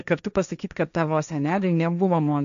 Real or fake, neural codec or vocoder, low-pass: fake; codec, 16 kHz, 1.1 kbps, Voila-Tokenizer; 7.2 kHz